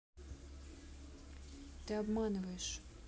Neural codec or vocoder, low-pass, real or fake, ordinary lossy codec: none; none; real; none